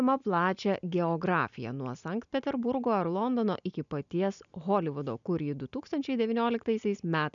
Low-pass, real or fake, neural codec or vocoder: 7.2 kHz; real; none